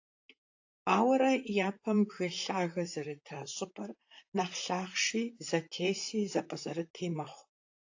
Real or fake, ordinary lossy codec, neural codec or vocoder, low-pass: fake; AAC, 48 kbps; vocoder, 44.1 kHz, 128 mel bands, Pupu-Vocoder; 7.2 kHz